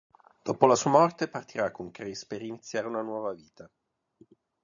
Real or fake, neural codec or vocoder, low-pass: real; none; 7.2 kHz